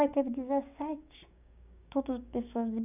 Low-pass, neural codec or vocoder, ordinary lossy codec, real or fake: 3.6 kHz; codec, 16 kHz, 6 kbps, DAC; none; fake